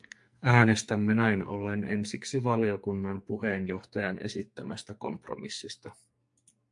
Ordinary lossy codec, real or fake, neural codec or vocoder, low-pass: MP3, 64 kbps; fake; codec, 44.1 kHz, 2.6 kbps, SNAC; 10.8 kHz